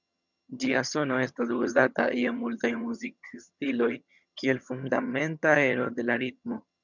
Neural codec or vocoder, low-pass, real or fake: vocoder, 22.05 kHz, 80 mel bands, HiFi-GAN; 7.2 kHz; fake